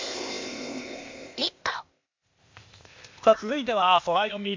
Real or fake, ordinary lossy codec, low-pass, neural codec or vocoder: fake; MP3, 64 kbps; 7.2 kHz; codec, 16 kHz, 0.8 kbps, ZipCodec